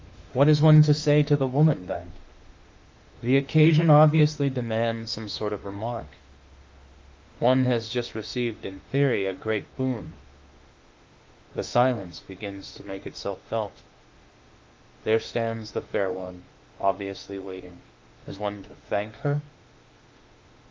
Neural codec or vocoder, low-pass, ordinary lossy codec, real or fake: autoencoder, 48 kHz, 32 numbers a frame, DAC-VAE, trained on Japanese speech; 7.2 kHz; Opus, 32 kbps; fake